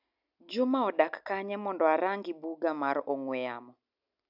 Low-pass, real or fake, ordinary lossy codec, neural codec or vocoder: 5.4 kHz; real; none; none